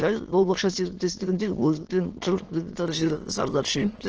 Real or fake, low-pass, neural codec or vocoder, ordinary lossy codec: fake; 7.2 kHz; autoencoder, 22.05 kHz, a latent of 192 numbers a frame, VITS, trained on many speakers; Opus, 16 kbps